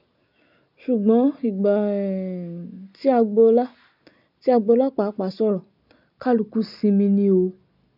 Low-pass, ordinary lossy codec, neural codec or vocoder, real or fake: 5.4 kHz; none; none; real